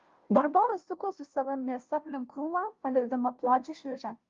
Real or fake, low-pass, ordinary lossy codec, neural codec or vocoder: fake; 7.2 kHz; Opus, 32 kbps; codec, 16 kHz, 0.5 kbps, FunCodec, trained on Chinese and English, 25 frames a second